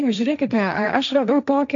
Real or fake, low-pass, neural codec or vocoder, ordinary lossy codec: fake; 7.2 kHz; codec, 16 kHz, 1.1 kbps, Voila-Tokenizer; MP3, 64 kbps